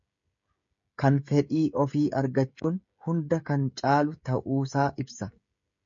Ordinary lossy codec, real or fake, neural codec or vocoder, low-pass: MP3, 48 kbps; fake; codec, 16 kHz, 16 kbps, FreqCodec, smaller model; 7.2 kHz